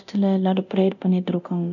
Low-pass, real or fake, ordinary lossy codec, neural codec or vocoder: 7.2 kHz; fake; none; codec, 24 kHz, 0.9 kbps, DualCodec